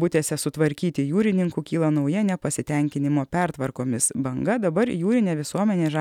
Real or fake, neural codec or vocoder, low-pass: real; none; 19.8 kHz